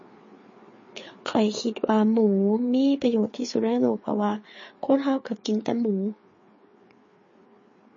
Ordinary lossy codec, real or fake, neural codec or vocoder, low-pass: MP3, 32 kbps; fake; codec, 16 kHz, 4 kbps, FreqCodec, larger model; 7.2 kHz